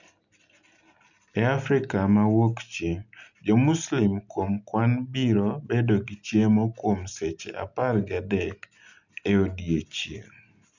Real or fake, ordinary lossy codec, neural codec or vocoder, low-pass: real; none; none; 7.2 kHz